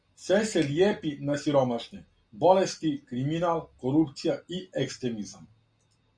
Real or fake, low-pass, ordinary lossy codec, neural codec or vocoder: real; 9.9 kHz; Opus, 64 kbps; none